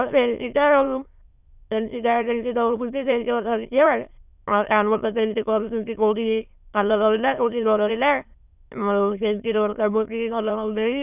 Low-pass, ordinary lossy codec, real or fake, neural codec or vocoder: 3.6 kHz; none; fake; autoencoder, 22.05 kHz, a latent of 192 numbers a frame, VITS, trained on many speakers